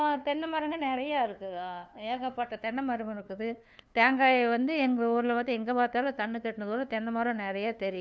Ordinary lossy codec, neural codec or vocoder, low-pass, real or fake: none; codec, 16 kHz, 2 kbps, FunCodec, trained on LibriTTS, 25 frames a second; none; fake